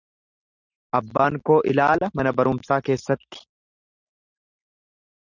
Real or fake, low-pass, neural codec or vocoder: real; 7.2 kHz; none